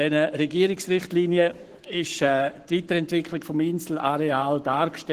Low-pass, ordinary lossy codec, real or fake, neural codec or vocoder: 14.4 kHz; Opus, 16 kbps; fake; codec, 44.1 kHz, 7.8 kbps, Pupu-Codec